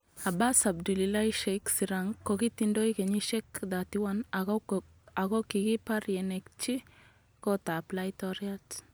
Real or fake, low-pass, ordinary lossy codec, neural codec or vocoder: real; none; none; none